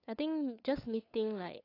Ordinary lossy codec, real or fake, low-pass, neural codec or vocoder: AAC, 24 kbps; fake; 5.4 kHz; codec, 16 kHz, 8 kbps, FunCodec, trained on LibriTTS, 25 frames a second